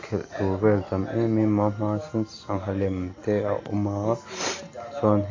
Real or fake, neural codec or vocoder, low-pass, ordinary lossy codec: real; none; 7.2 kHz; AAC, 32 kbps